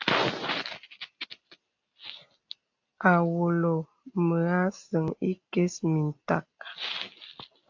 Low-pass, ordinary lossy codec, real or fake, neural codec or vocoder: 7.2 kHz; AAC, 48 kbps; real; none